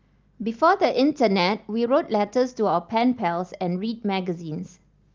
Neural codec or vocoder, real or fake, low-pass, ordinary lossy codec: none; real; 7.2 kHz; Opus, 32 kbps